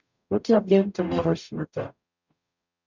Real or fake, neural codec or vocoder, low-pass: fake; codec, 44.1 kHz, 0.9 kbps, DAC; 7.2 kHz